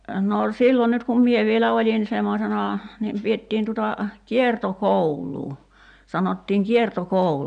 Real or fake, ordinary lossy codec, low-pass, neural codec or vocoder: real; none; 9.9 kHz; none